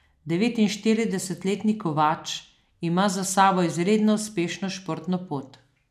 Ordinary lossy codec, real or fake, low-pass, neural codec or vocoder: none; real; 14.4 kHz; none